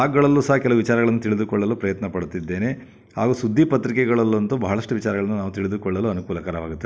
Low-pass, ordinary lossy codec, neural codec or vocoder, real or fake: none; none; none; real